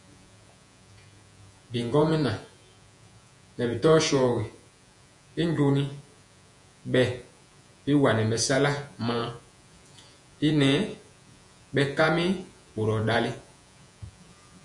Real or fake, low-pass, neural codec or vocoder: fake; 10.8 kHz; vocoder, 48 kHz, 128 mel bands, Vocos